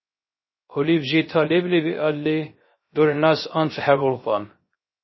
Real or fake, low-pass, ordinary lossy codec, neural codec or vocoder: fake; 7.2 kHz; MP3, 24 kbps; codec, 16 kHz, 0.3 kbps, FocalCodec